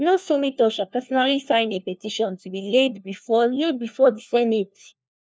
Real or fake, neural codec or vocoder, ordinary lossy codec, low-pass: fake; codec, 16 kHz, 1 kbps, FunCodec, trained on LibriTTS, 50 frames a second; none; none